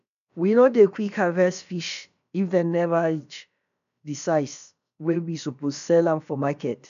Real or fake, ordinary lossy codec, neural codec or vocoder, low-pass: fake; none; codec, 16 kHz, about 1 kbps, DyCAST, with the encoder's durations; 7.2 kHz